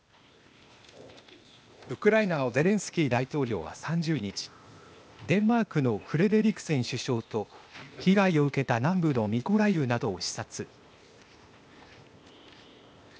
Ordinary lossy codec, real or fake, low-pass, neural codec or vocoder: none; fake; none; codec, 16 kHz, 0.8 kbps, ZipCodec